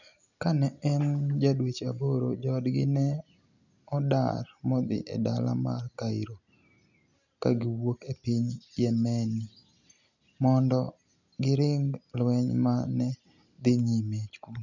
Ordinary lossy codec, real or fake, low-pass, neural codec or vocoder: none; real; 7.2 kHz; none